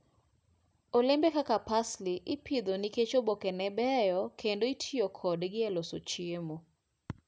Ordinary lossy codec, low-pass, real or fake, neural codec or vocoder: none; none; real; none